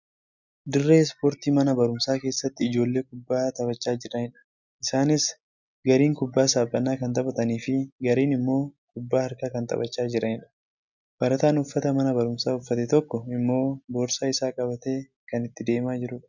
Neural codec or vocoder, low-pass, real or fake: none; 7.2 kHz; real